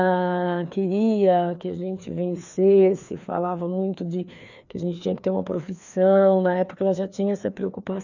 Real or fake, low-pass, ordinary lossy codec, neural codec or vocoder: fake; 7.2 kHz; none; codec, 16 kHz, 2 kbps, FreqCodec, larger model